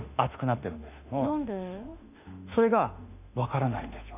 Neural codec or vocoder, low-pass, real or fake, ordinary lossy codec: autoencoder, 48 kHz, 32 numbers a frame, DAC-VAE, trained on Japanese speech; 3.6 kHz; fake; none